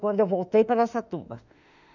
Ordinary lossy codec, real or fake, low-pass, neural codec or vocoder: none; fake; 7.2 kHz; autoencoder, 48 kHz, 32 numbers a frame, DAC-VAE, trained on Japanese speech